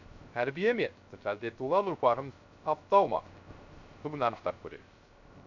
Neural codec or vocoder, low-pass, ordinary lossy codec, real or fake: codec, 16 kHz, 0.3 kbps, FocalCodec; 7.2 kHz; none; fake